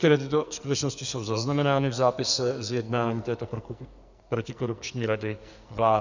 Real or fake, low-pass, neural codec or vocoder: fake; 7.2 kHz; codec, 44.1 kHz, 2.6 kbps, SNAC